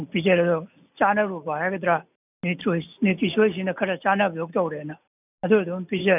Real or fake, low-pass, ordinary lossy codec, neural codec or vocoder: real; 3.6 kHz; none; none